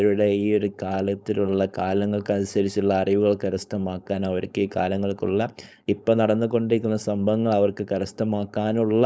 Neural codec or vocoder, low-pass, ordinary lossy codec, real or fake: codec, 16 kHz, 4.8 kbps, FACodec; none; none; fake